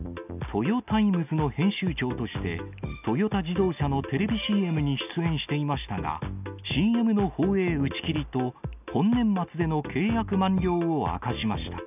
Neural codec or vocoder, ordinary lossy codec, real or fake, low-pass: none; none; real; 3.6 kHz